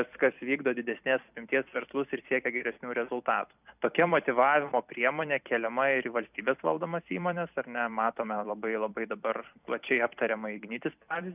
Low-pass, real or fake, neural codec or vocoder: 3.6 kHz; fake; vocoder, 44.1 kHz, 128 mel bands every 256 samples, BigVGAN v2